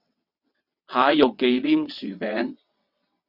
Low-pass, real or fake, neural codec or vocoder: 5.4 kHz; fake; vocoder, 22.05 kHz, 80 mel bands, WaveNeXt